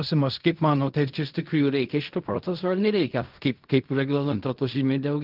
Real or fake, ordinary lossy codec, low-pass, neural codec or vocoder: fake; Opus, 24 kbps; 5.4 kHz; codec, 16 kHz in and 24 kHz out, 0.4 kbps, LongCat-Audio-Codec, fine tuned four codebook decoder